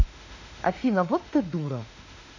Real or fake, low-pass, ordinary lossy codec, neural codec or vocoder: fake; 7.2 kHz; none; autoencoder, 48 kHz, 32 numbers a frame, DAC-VAE, trained on Japanese speech